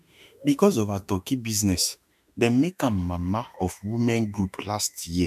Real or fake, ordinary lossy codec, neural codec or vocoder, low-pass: fake; none; autoencoder, 48 kHz, 32 numbers a frame, DAC-VAE, trained on Japanese speech; 14.4 kHz